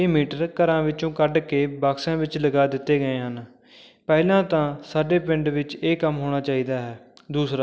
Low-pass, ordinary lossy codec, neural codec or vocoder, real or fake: none; none; none; real